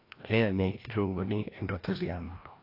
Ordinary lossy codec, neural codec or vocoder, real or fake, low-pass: AAC, 32 kbps; codec, 16 kHz, 1 kbps, FreqCodec, larger model; fake; 5.4 kHz